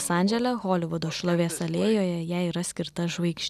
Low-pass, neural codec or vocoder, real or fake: 14.4 kHz; none; real